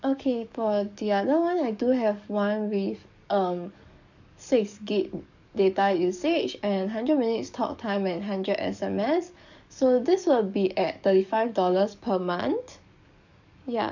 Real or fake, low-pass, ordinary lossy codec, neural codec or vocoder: fake; 7.2 kHz; none; codec, 44.1 kHz, 7.8 kbps, DAC